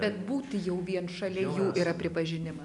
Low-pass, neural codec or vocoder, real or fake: 10.8 kHz; none; real